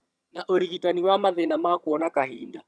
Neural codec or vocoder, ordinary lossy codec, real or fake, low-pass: vocoder, 22.05 kHz, 80 mel bands, HiFi-GAN; none; fake; none